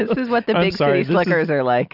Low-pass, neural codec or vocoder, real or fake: 5.4 kHz; none; real